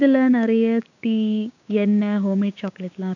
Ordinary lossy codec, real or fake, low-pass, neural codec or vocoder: none; fake; 7.2 kHz; codec, 16 kHz, 6 kbps, DAC